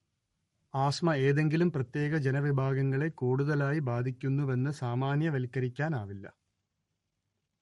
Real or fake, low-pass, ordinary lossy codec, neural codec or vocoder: fake; 19.8 kHz; MP3, 48 kbps; codec, 44.1 kHz, 7.8 kbps, Pupu-Codec